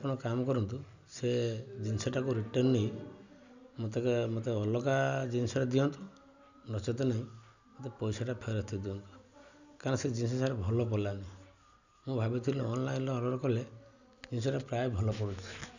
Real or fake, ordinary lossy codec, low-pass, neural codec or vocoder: real; none; 7.2 kHz; none